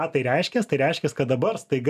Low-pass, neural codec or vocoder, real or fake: 14.4 kHz; none; real